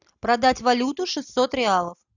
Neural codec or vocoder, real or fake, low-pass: none; real; 7.2 kHz